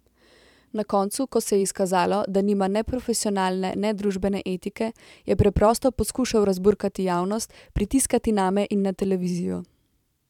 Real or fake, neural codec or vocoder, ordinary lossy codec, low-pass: fake; vocoder, 44.1 kHz, 128 mel bands every 512 samples, BigVGAN v2; none; 19.8 kHz